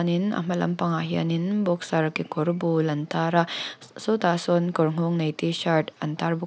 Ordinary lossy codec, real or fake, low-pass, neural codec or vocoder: none; real; none; none